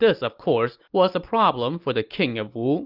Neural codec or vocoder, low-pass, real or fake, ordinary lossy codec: none; 5.4 kHz; real; Opus, 24 kbps